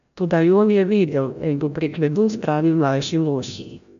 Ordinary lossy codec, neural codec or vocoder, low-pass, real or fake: none; codec, 16 kHz, 0.5 kbps, FreqCodec, larger model; 7.2 kHz; fake